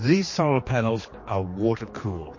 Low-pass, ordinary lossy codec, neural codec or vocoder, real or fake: 7.2 kHz; MP3, 32 kbps; codec, 16 kHz, 2 kbps, X-Codec, HuBERT features, trained on general audio; fake